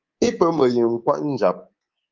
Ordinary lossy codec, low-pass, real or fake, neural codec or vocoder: Opus, 24 kbps; 7.2 kHz; fake; codec, 24 kHz, 3.1 kbps, DualCodec